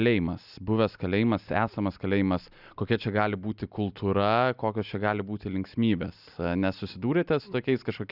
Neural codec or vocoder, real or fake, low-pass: none; real; 5.4 kHz